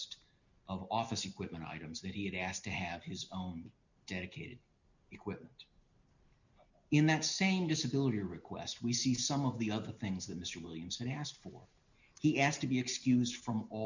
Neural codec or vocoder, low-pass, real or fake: none; 7.2 kHz; real